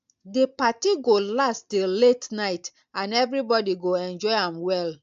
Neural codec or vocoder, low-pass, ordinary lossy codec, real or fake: none; 7.2 kHz; AAC, 64 kbps; real